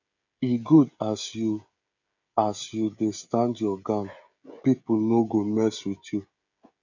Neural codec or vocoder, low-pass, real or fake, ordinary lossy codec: codec, 16 kHz, 16 kbps, FreqCodec, smaller model; 7.2 kHz; fake; none